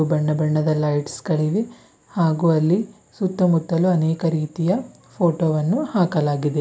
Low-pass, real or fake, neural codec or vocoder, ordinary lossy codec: none; real; none; none